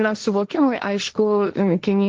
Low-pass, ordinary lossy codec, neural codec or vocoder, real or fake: 7.2 kHz; Opus, 16 kbps; codec, 16 kHz, 1.1 kbps, Voila-Tokenizer; fake